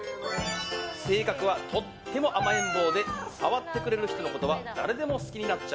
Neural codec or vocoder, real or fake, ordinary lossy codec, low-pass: none; real; none; none